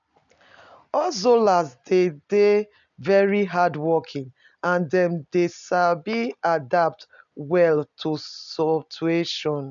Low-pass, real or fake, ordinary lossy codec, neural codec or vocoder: 7.2 kHz; real; none; none